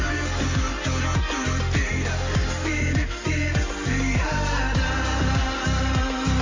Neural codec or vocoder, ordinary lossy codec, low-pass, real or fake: none; AAC, 32 kbps; 7.2 kHz; real